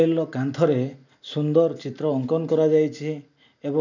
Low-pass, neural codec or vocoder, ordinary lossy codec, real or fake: 7.2 kHz; none; none; real